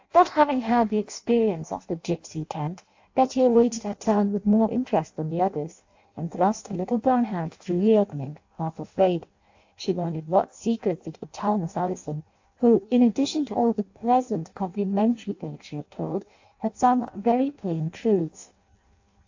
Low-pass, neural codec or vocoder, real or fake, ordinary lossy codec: 7.2 kHz; codec, 16 kHz in and 24 kHz out, 0.6 kbps, FireRedTTS-2 codec; fake; AAC, 48 kbps